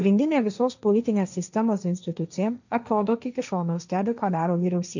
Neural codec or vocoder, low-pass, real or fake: codec, 16 kHz, 1.1 kbps, Voila-Tokenizer; 7.2 kHz; fake